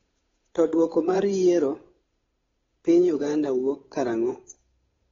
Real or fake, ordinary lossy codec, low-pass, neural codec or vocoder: fake; AAC, 24 kbps; 7.2 kHz; codec, 16 kHz, 8 kbps, FunCodec, trained on Chinese and English, 25 frames a second